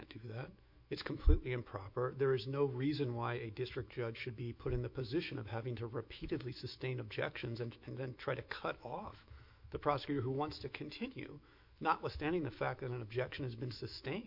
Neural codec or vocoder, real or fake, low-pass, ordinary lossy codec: autoencoder, 48 kHz, 128 numbers a frame, DAC-VAE, trained on Japanese speech; fake; 5.4 kHz; MP3, 48 kbps